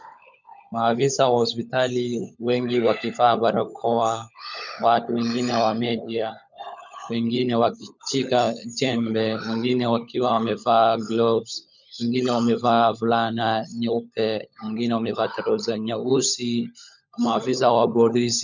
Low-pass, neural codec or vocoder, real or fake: 7.2 kHz; codec, 16 kHz, 16 kbps, FunCodec, trained on LibriTTS, 50 frames a second; fake